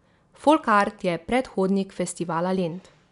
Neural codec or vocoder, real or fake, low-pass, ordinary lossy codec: none; real; 10.8 kHz; none